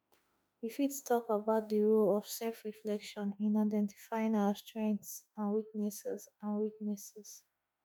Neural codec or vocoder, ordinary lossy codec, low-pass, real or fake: autoencoder, 48 kHz, 32 numbers a frame, DAC-VAE, trained on Japanese speech; none; none; fake